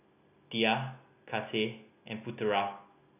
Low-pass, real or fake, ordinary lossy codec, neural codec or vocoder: 3.6 kHz; real; none; none